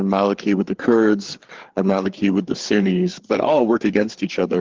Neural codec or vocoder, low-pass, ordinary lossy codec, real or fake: codec, 16 kHz, 4 kbps, FreqCodec, larger model; 7.2 kHz; Opus, 16 kbps; fake